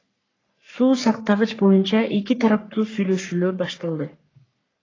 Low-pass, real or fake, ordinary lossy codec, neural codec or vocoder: 7.2 kHz; fake; AAC, 32 kbps; codec, 44.1 kHz, 3.4 kbps, Pupu-Codec